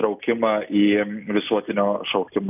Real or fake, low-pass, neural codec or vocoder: real; 3.6 kHz; none